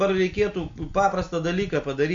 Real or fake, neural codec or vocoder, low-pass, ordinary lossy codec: real; none; 7.2 kHz; MP3, 48 kbps